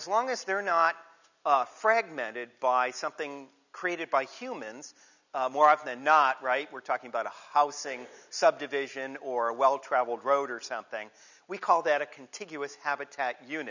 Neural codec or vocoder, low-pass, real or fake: none; 7.2 kHz; real